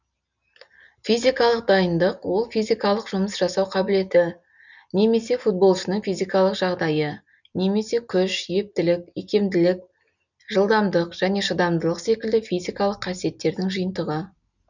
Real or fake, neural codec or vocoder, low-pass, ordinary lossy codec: real; none; 7.2 kHz; none